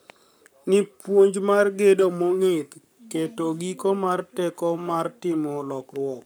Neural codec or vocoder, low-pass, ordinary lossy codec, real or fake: codec, 44.1 kHz, 7.8 kbps, Pupu-Codec; none; none; fake